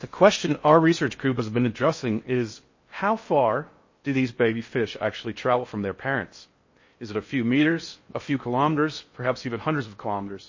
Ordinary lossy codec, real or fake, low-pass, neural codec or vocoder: MP3, 32 kbps; fake; 7.2 kHz; codec, 16 kHz in and 24 kHz out, 0.6 kbps, FocalCodec, streaming, 4096 codes